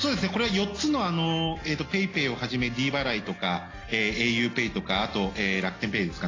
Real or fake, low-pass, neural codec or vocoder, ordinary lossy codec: real; 7.2 kHz; none; AAC, 32 kbps